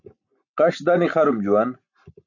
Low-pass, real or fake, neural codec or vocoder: 7.2 kHz; real; none